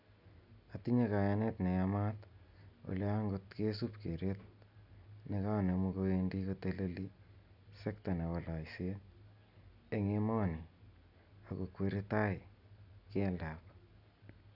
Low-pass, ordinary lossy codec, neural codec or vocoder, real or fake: 5.4 kHz; none; none; real